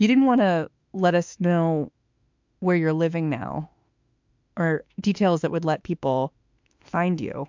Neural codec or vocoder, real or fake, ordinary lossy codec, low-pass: autoencoder, 48 kHz, 32 numbers a frame, DAC-VAE, trained on Japanese speech; fake; MP3, 64 kbps; 7.2 kHz